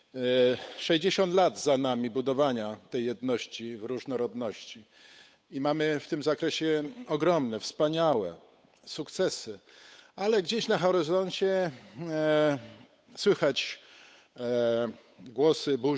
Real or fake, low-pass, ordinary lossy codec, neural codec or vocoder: fake; none; none; codec, 16 kHz, 8 kbps, FunCodec, trained on Chinese and English, 25 frames a second